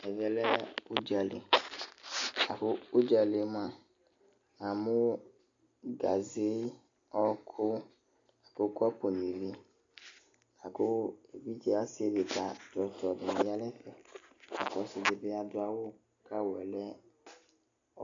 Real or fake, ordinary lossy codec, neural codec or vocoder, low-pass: real; AAC, 64 kbps; none; 7.2 kHz